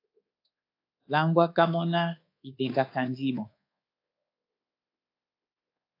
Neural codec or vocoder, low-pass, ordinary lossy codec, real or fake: codec, 24 kHz, 1.2 kbps, DualCodec; 5.4 kHz; AAC, 32 kbps; fake